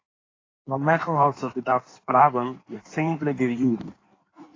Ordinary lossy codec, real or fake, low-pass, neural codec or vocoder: AAC, 32 kbps; fake; 7.2 kHz; codec, 16 kHz in and 24 kHz out, 1.1 kbps, FireRedTTS-2 codec